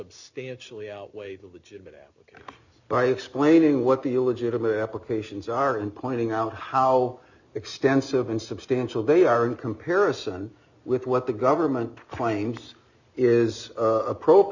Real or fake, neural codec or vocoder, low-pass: real; none; 7.2 kHz